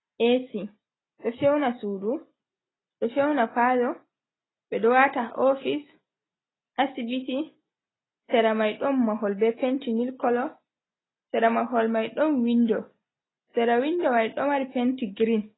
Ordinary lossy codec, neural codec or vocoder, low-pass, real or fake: AAC, 16 kbps; none; 7.2 kHz; real